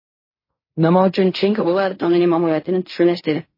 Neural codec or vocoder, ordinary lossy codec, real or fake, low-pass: codec, 16 kHz in and 24 kHz out, 0.4 kbps, LongCat-Audio-Codec, fine tuned four codebook decoder; MP3, 24 kbps; fake; 5.4 kHz